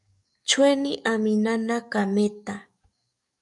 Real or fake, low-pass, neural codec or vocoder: fake; 10.8 kHz; codec, 44.1 kHz, 7.8 kbps, DAC